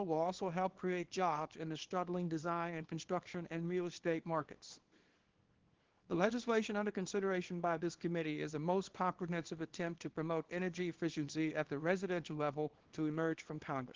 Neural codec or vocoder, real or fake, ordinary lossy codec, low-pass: codec, 24 kHz, 0.9 kbps, WavTokenizer, small release; fake; Opus, 16 kbps; 7.2 kHz